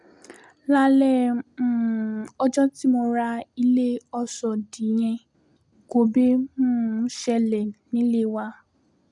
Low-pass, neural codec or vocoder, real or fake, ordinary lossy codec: 10.8 kHz; none; real; none